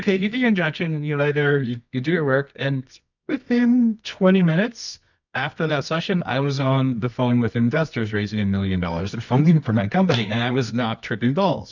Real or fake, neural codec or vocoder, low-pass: fake; codec, 24 kHz, 0.9 kbps, WavTokenizer, medium music audio release; 7.2 kHz